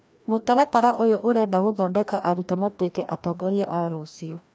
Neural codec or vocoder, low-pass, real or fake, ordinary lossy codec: codec, 16 kHz, 1 kbps, FreqCodec, larger model; none; fake; none